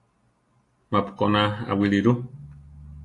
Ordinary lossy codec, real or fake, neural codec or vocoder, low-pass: AAC, 48 kbps; real; none; 10.8 kHz